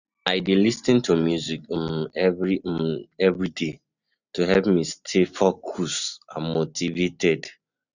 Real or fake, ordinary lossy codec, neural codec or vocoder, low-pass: real; none; none; 7.2 kHz